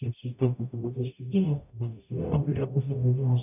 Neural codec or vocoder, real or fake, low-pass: codec, 44.1 kHz, 0.9 kbps, DAC; fake; 3.6 kHz